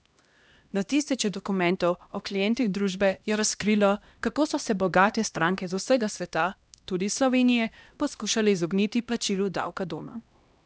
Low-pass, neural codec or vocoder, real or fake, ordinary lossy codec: none; codec, 16 kHz, 1 kbps, X-Codec, HuBERT features, trained on LibriSpeech; fake; none